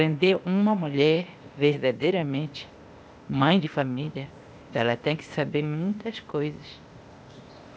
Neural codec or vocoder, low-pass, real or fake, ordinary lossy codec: codec, 16 kHz, 0.8 kbps, ZipCodec; none; fake; none